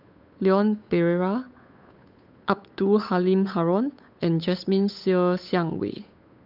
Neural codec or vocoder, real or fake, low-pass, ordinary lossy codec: codec, 16 kHz, 8 kbps, FunCodec, trained on Chinese and English, 25 frames a second; fake; 5.4 kHz; none